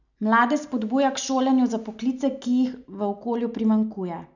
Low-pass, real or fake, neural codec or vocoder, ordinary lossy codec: 7.2 kHz; real; none; none